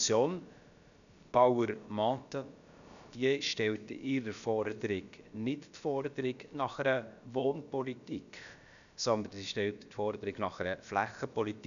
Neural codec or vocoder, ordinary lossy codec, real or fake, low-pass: codec, 16 kHz, about 1 kbps, DyCAST, with the encoder's durations; none; fake; 7.2 kHz